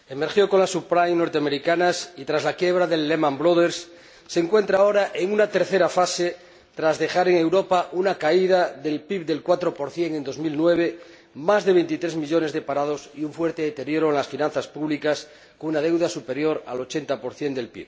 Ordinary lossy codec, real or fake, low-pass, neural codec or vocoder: none; real; none; none